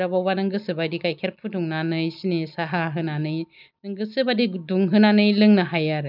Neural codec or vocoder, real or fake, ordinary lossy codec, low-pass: none; real; none; 5.4 kHz